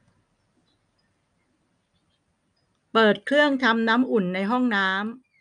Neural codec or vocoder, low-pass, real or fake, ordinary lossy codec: none; 9.9 kHz; real; none